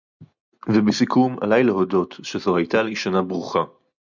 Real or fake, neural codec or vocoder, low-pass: real; none; 7.2 kHz